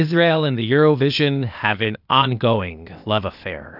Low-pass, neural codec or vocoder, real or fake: 5.4 kHz; codec, 16 kHz, 0.8 kbps, ZipCodec; fake